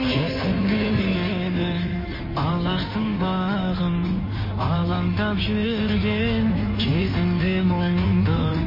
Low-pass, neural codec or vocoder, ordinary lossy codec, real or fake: 5.4 kHz; codec, 16 kHz in and 24 kHz out, 1.1 kbps, FireRedTTS-2 codec; MP3, 24 kbps; fake